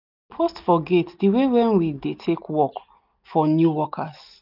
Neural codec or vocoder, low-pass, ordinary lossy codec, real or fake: none; 5.4 kHz; none; real